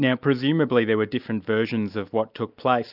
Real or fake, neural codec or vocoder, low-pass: real; none; 5.4 kHz